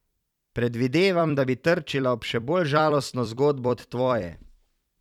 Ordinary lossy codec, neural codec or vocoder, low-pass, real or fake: none; vocoder, 44.1 kHz, 128 mel bands every 256 samples, BigVGAN v2; 19.8 kHz; fake